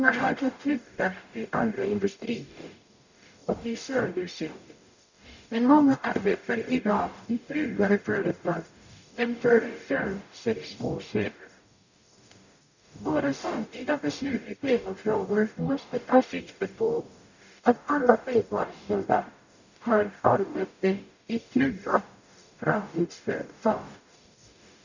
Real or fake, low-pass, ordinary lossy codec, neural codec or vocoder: fake; 7.2 kHz; none; codec, 44.1 kHz, 0.9 kbps, DAC